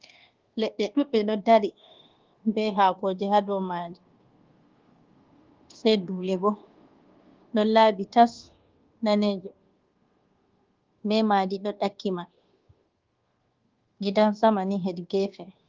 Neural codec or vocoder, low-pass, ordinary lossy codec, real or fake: codec, 24 kHz, 1.2 kbps, DualCodec; 7.2 kHz; Opus, 16 kbps; fake